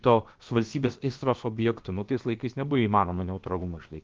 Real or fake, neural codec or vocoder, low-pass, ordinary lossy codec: fake; codec, 16 kHz, about 1 kbps, DyCAST, with the encoder's durations; 7.2 kHz; Opus, 32 kbps